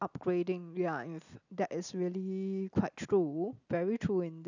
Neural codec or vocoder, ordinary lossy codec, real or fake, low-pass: none; none; real; 7.2 kHz